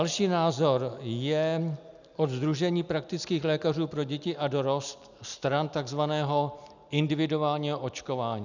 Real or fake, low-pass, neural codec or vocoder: real; 7.2 kHz; none